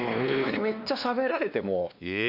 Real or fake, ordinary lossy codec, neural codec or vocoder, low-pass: fake; none; codec, 16 kHz, 2 kbps, X-Codec, WavLM features, trained on Multilingual LibriSpeech; 5.4 kHz